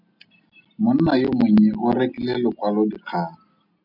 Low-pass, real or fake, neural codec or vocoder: 5.4 kHz; real; none